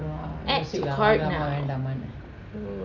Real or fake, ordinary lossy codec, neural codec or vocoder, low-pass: real; none; none; 7.2 kHz